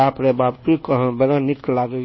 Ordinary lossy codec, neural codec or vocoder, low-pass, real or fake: MP3, 24 kbps; codec, 24 kHz, 1.2 kbps, DualCodec; 7.2 kHz; fake